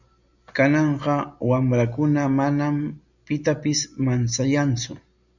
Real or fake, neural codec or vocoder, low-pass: real; none; 7.2 kHz